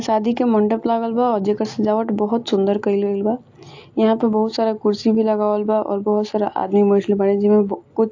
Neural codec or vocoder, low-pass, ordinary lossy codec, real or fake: none; 7.2 kHz; AAC, 48 kbps; real